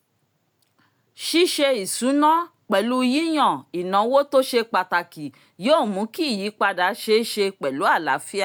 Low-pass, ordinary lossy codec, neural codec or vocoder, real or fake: none; none; none; real